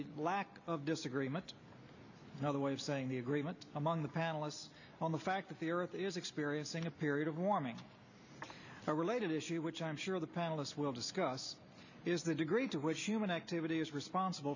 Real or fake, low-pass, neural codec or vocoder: real; 7.2 kHz; none